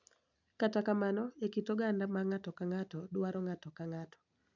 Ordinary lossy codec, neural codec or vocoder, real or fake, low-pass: none; none; real; 7.2 kHz